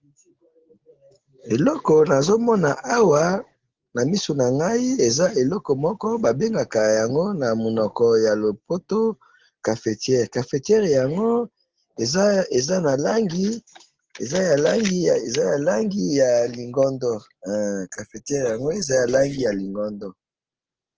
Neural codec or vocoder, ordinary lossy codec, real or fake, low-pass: none; Opus, 16 kbps; real; 7.2 kHz